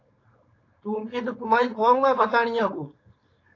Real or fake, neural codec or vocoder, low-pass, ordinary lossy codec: fake; codec, 16 kHz, 4.8 kbps, FACodec; 7.2 kHz; AAC, 32 kbps